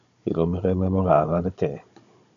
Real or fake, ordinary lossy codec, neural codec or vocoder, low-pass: fake; AAC, 96 kbps; codec, 16 kHz, 16 kbps, FunCodec, trained on Chinese and English, 50 frames a second; 7.2 kHz